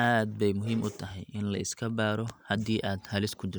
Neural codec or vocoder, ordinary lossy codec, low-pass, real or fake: none; none; none; real